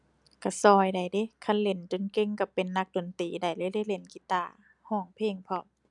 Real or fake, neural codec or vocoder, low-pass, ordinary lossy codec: real; none; 10.8 kHz; none